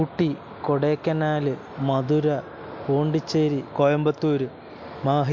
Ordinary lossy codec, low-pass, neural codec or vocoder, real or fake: MP3, 48 kbps; 7.2 kHz; none; real